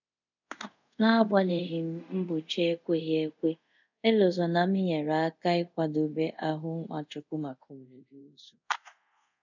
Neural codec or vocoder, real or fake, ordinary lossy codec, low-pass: codec, 24 kHz, 0.5 kbps, DualCodec; fake; none; 7.2 kHz